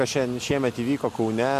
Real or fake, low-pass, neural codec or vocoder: real; 14.4 kHz; none